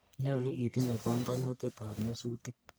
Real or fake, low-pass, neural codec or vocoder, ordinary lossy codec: fake; none; codec, 44.1 kHz, 1.7 kbps, Pupu-Codec; none